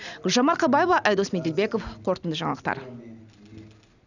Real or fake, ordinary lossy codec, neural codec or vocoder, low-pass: real; none; none; 7.2 kHz